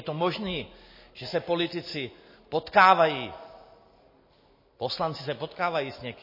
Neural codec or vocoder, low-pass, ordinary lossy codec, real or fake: none; 5.4 kHz; MP3, 24 kbps; real